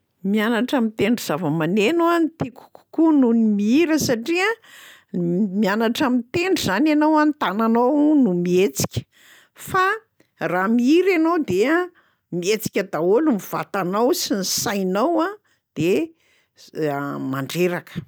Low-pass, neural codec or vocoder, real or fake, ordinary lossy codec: none; none; real; none